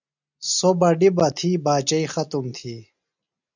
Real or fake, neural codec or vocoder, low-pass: real; none; 7.2 kHz